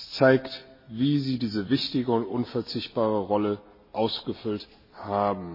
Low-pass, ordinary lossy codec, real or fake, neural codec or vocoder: 5.4 kHz; MP3, 24 kbps; real; none